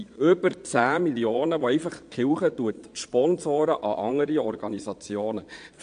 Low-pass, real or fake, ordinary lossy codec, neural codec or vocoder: 9.9 kHz; fake; AAC, 64 kbps; vocoder, 22.05 kHz, 80 mel bands, Vocos